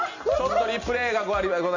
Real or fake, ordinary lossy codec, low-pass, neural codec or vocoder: real; none; 7.2 kHz; none